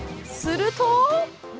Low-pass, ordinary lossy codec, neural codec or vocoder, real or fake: none; none; none; real